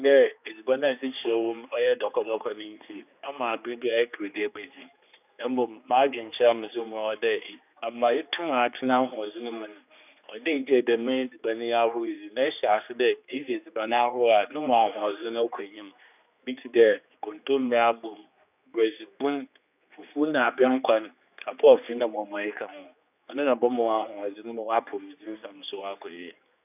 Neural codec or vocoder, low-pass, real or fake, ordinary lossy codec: codec, 16 kHz, 2 kbps, X-Codec, HuBERT features, trained on general audio; 3.6 kHz; fake; none